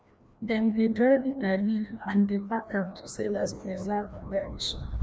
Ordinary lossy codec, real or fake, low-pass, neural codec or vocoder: none; fake; none; codec, 16 kHz, 1 kbps, FreqCodec, larger model